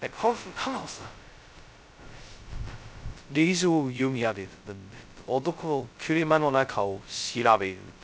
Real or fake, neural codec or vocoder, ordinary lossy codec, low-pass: fake; codec, 16 kHz, 0.2 kbps, FocalCodec; none; none